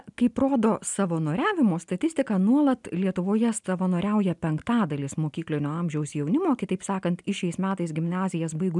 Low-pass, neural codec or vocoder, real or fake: 10.8 kHz; none; real